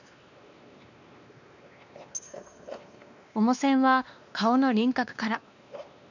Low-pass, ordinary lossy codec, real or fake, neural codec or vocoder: 7.2 kHz; none; fake; codec, 16 kHz, 2 kbps, X-Codec, WavLM features, trained on Multilingual LibriSpeech